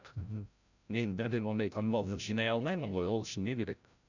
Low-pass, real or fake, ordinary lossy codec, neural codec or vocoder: 7.2 kHz; fake; none; codec, 16 kHz, 0.5 kbps, FreqCodec, larger model